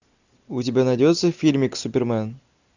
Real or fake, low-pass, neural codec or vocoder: real; 7.2 kHz; none